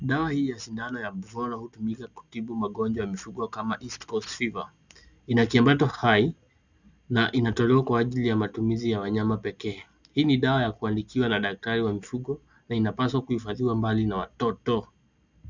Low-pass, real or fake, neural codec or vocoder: 7.2 kHz; real; none